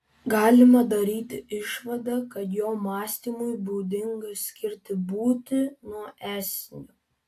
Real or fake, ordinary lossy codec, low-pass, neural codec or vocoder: real; AAC, 64 kbps; 14.4 kHz; none